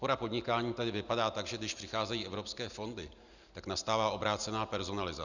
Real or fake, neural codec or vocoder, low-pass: real; none; 7.2 kHz